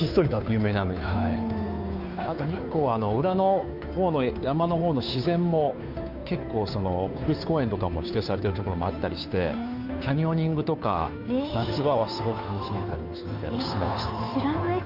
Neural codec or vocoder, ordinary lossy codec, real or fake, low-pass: codec, 16 kHz, 2 kbps, FunCodec, trained on Chinese and English, 25 frames a second; none; fake; 5.4 kHz